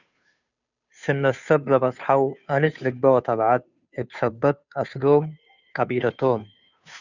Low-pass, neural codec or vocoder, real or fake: 7.2 kHz; codec, 16 kHz, 2 kbps, FunCodec, trained on Chinese and English, 25 frames a second; fake